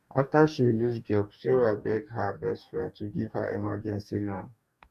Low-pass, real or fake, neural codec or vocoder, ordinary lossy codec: 14.4 kHz; fake; codec, 44.1 kHz, 2.6 kbps, DAC; none